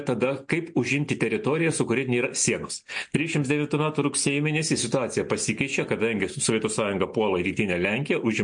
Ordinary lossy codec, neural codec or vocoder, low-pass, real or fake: MP3, 48 kbps; none; 9.9 kHz; real